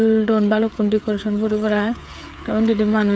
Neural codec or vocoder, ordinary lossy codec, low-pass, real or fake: codec, 16 kHz, 8 kbps, FreqCodec, smaller model; none; none; fake